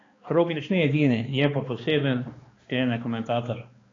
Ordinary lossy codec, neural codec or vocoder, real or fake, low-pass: AAC, 32 kbps; codec, 16 kHz, 2 kbps, X-Codec, HuBERT features, trained on balanced general audio; fake; 7.2 kHz